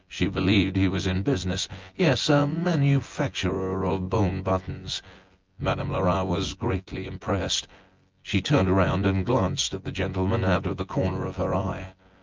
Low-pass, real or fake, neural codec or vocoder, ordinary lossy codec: 7.2 kHz; fake; vocoder, 24 kHz, 100 mel bands, Vocos; Opus, 32 kbps